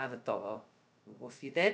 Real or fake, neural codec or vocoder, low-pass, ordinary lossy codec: fake; codec, 16 kHz, 0.2 kbps, FocalCodec; none; none